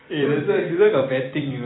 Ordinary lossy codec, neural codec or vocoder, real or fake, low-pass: AAC, 16 kbps; vocoder, 44.1 kHz, 128 mel bands every 256 samples, BigVGAN v2; fake; 7.2 kHz